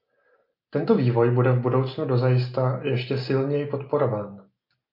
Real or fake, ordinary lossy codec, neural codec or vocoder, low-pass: real; MP3, 32 kbps; none; 5.4 kHz